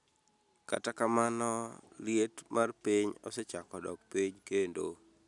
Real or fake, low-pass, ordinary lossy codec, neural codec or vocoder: real; 10.8 kHz; none; none